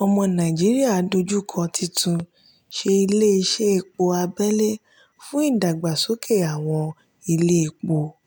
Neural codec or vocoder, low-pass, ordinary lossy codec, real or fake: none; none; none; real